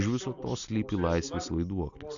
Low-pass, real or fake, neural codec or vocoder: 7.2 kHz; real; none